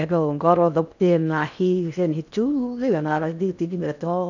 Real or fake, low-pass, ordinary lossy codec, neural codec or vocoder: fake; 7.2 kHz; none; codec, 16 kHz in and 24 kHz out, 0.6 kbps, FocalCodec, streaming, 4096 codes